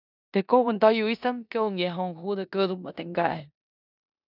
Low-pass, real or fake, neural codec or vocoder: 5.4 kHz; fake; codec, 16 kHz in and 24 kHz out, 0.9 kbps, LongCat-Audio-Codec, four codebook decoder